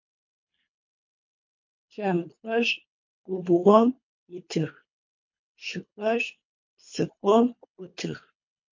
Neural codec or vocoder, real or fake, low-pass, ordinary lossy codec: codec, 24 kHz, 3 kbps, HILCodec; fake; 7.2 kHz; MP3, 48 kbps